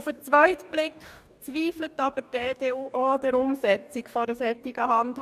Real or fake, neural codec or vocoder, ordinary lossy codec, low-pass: fake; codec, 44.1 kHz, 2.6 kbps, DAC; none; 14.4 kHz